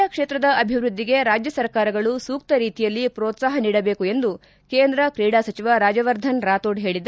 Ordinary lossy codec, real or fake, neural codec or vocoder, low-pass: none; real; none; none